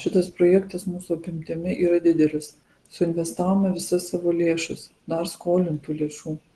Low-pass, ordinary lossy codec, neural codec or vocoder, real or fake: 10.8 kHz; Opus, 16 kbps; none; real